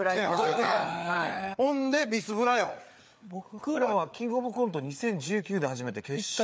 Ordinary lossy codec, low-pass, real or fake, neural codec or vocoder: none; none; fake; codec, 16 kHz, 4 kbps, FreqCodec, larger model